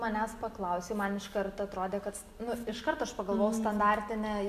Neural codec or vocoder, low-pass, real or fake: none; 14.4 kHz; real